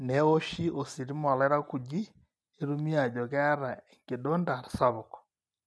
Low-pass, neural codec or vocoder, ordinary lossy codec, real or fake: none; none; none; real